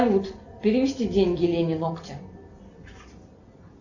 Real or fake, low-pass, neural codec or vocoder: real; 7.2 kHz; none